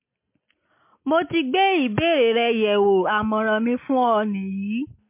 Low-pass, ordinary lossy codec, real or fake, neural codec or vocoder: 3.6 kHz; MP3, 24 kbps; real; none